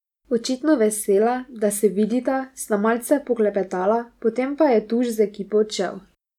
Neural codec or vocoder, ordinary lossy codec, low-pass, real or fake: none; none; 19.8 kHz; real